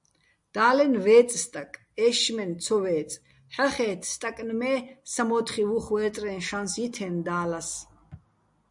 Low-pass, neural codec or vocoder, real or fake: 10.8 kHz; none; real